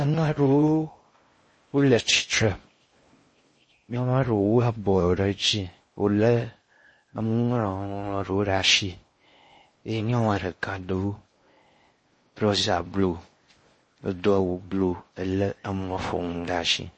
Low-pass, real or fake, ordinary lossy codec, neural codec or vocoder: 9.9 kHz; fake; MP3, 32 kbps; codec, 16 kHz in and 24 kHz out, 0.6 kbps, FocalCodec, streaming, 4096 codes